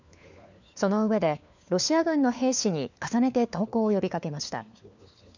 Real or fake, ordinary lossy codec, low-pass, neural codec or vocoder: fake; none; 7.2 kHz; codec, 16 kHz, 4 kbps, FunCodec, trained on LibriTTS, 50 frames a second